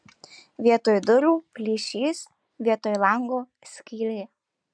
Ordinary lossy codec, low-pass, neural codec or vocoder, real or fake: MP3, 96 kbps; 9.9 kHz; none; real